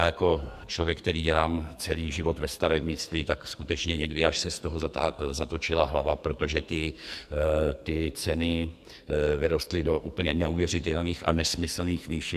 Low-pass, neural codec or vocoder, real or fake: 14.4 kHz; codec, 44.1 kHz, 2.6 kbps, SNAC; fake